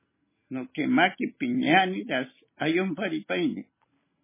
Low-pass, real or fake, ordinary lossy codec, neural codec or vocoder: 3.6 kHz; real; MP3, 16 kbps; none